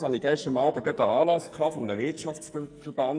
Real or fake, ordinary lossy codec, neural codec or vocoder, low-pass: fake; none; codec, 44.1 kHz, 2.6 kbps, SNAC; 9.9 kHz